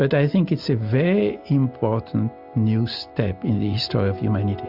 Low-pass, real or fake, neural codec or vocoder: 5.4 kHz; real; none